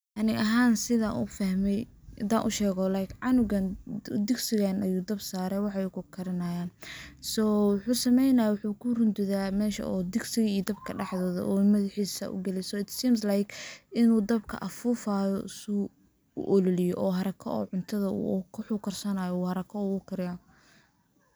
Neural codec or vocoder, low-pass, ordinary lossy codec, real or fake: none; none; none; real